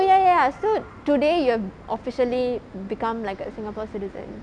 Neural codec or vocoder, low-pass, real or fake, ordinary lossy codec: none; 9.9 kHz; real; none